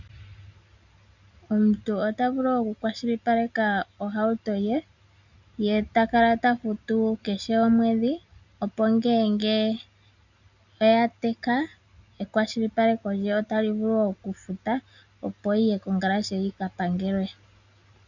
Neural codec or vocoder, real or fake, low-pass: none; real; 7.2 kHz